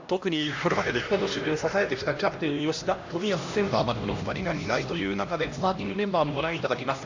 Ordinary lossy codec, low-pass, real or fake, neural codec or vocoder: AAC, 48 kbps; 7.2 kHz; fake; codec, 16 kHz, 1 kbps, X-Codec, HuBERT features, trained on LibriSpeech